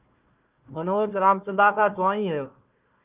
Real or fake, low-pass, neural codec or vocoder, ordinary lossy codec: fake; 3.6 kHz; codec, 16 kHz, 1 kbps, FunCodec, trained on Chinese and English, 50 frames a second; Opus, 32 kbps